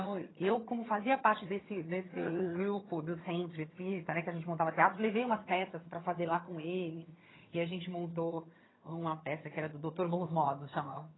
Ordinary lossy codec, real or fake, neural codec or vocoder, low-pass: AAC, 16 kbps; fake; vocoder, 22.05 kHz, 80 mel bands, HiFi-GAN; 7.2 kHz